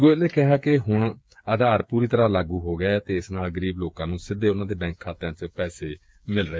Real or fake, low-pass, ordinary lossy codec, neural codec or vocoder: fake; none; none; codec, 16 kHz, 8 kbps, FreqCodec, smaller model